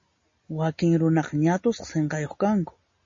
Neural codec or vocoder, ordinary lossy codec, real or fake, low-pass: none; MP3, 32 kbps; real; 7.2 kHz